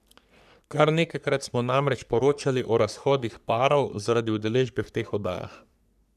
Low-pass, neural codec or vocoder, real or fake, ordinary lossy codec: 14.4 kHz; codec, 44.1 kHz, 3.4 kbps, Pupu-Codec; fake; none